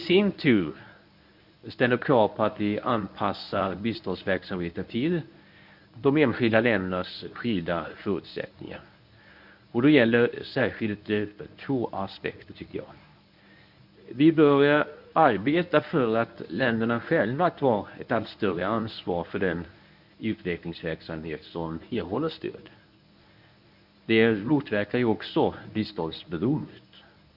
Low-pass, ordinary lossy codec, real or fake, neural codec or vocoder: 5.4 kHz; none; fake; codec, 24 kHz, 0.9 kbps, WavTokenizer, medium speech release version 1